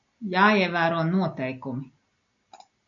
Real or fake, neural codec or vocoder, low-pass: real; none; 7.2 kHz